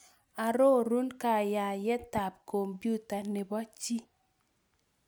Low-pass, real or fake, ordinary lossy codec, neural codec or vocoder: none; real; none; none